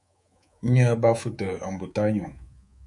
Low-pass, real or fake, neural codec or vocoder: 10.8 kHz; fake; codec, 24 kHz, 3.1 kbps, DualCodec